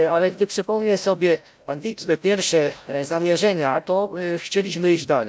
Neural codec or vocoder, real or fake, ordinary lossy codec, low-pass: codec, 16 kHz, 0.5 kbps, FreqCodec, larger model; fake; none; none